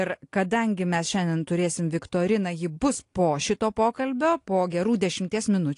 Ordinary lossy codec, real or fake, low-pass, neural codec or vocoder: AAC, 48 kbps; real; 10.8 kHz; none